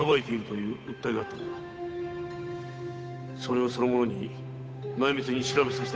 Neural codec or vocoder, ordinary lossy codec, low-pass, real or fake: codec, 16 kHz, 8 kbps, FunCodec, trained on Chinese and English, 25 frames a second; none; none; fake